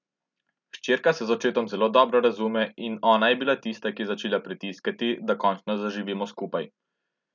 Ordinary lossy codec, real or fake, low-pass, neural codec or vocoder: none; real; 7.2 kHz; none